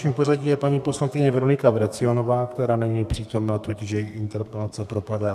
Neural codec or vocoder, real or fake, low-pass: codec, 32 kHz, 1.9 kbps, SNAC; fake; 14.4 kHz